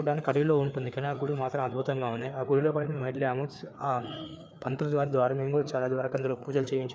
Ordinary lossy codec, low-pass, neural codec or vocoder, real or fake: none; none; codec, 16 kHz, 4 kbps, FreqCodec, larger model; fake